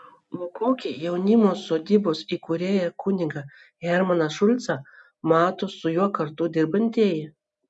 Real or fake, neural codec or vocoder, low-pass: real; none; 10.8 kHz